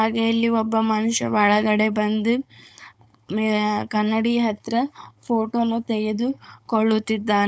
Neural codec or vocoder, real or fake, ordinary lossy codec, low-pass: codec, 16 kHz, 4.8 kbps, FACodec; fake; none; none